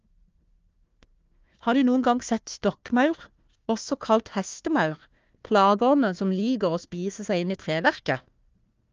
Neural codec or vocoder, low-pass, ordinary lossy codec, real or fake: codec, 16 kHz, 1 kbps, FunCodec, trained on Chinese and English, 50 frames a second; 7.2 kHz; Opus, 24 kbps; fake